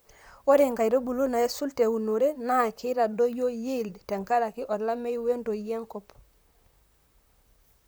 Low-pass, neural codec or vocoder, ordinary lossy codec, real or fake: none; vocoder, 44.1 kHz, 128 mel bands, Pupu-Vocoder; none; fake